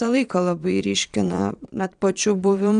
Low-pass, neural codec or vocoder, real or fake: 9.9 kHz; vocoder, 22.05 kHz, 80 mel bands, Vocos; fake